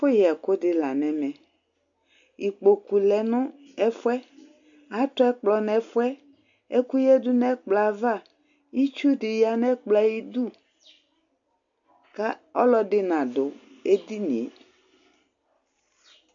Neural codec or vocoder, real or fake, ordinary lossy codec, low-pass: none; real; AAC, 64 kbps; 7.2 kHz